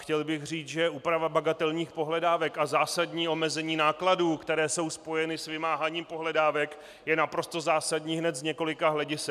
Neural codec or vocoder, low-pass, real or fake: none; 14.4 kHz; real